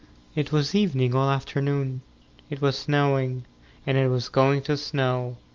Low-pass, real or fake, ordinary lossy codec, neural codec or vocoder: 7.2 kHz; real; Opus, 32 kbps; none